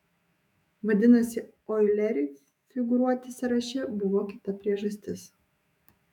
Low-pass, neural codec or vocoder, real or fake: 19.8 kHz; autoencoder, 48 kHz, 128 numbers a frame, DAC-VAE, trained on Japanese speech; fake